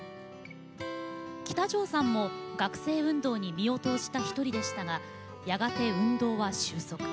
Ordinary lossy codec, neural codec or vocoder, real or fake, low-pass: none; none; real; none